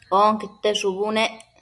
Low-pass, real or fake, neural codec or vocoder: 10.8 kHz; real; none